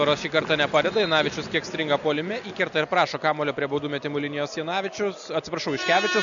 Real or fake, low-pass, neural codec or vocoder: real; 7.2 kHz; none